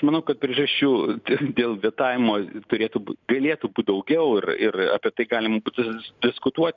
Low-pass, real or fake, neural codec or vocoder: 7.2 kHz; real; none